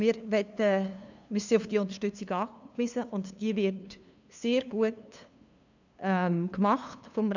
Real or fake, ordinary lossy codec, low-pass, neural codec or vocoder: fake; none; 7.2 kHz; codec, 16 kHz, 2 kbps, FunCodec, trained on LibriTTS, 25 frames a second